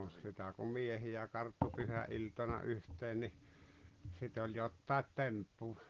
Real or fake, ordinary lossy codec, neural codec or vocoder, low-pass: real; Opus, 16 kbps; none; 7.2 kHz